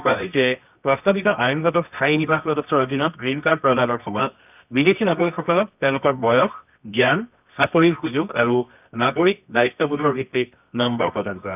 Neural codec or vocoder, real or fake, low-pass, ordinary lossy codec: codec, 24 kHz, 0.9 kbps, WavTokenizer, medium music audio release; fake; 3.6 kHz; none